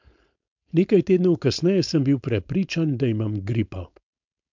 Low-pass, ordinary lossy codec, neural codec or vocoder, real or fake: 7.2 kHz; MP3, 64 kbps; codec, 16 kHz, 4.8 kbps, FACodec; fake